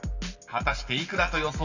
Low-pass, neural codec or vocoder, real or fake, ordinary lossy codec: 7.2 kHz; none; real; none